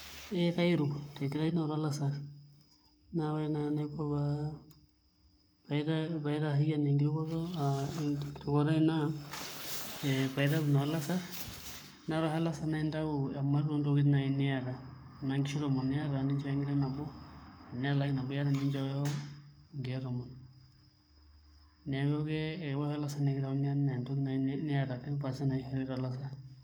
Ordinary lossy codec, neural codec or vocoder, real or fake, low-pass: none; codec, 44.1 kHz, 7.8 kbps, Pupu-Codec; fake; none